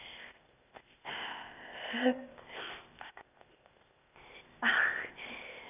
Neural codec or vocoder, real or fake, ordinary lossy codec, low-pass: codec, 16 kHz, 0.8 kbps, ZipCodec; fake; none; 3.6 kHz